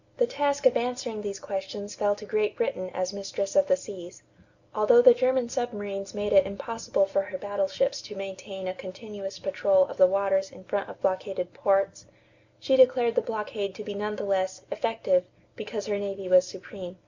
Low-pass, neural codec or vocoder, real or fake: 7.2 kHz; none; real